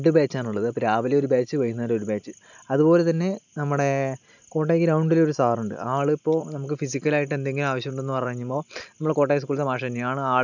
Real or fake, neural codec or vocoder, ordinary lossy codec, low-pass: real; none; none; 7.2 kHz